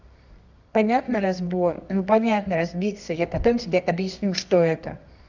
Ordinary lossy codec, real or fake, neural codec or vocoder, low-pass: none; fake; codec, 24 kHz, 0.9 kbps, WavTokenizer, medium music audio release; 7.2 kHz